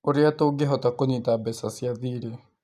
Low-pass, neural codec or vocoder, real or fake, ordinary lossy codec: 14.4 kHz; none; real; none